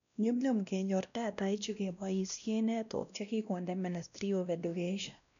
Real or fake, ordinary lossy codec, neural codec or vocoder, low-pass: fake; none; codec, 16 kHz, 1 kbps, X-Codec, WavLM features, trained on Multilingual LibriSpeech; 7.2 kHz